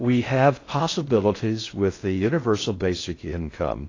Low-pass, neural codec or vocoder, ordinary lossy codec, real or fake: 7.2 kHz; codec, 16 kHz in and 24 kHz out, 0.6 kbps, FocalCodec, streaming, 4096 codes; AAC, 32 kbps; fake